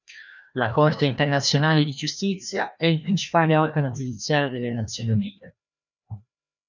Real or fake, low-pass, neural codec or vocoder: fake; 7.2 kHz; codec, 16 kHz, 1 kbps, FreqCodec, larger model